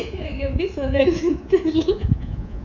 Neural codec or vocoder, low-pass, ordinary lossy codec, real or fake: codec, 24 kHz, 3.1 kbps, DualCodec; 7.2 kHz; none; fake